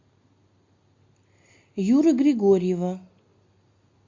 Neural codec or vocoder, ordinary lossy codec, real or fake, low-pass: none; MP3, 48 kbps; real; 7.2 kHz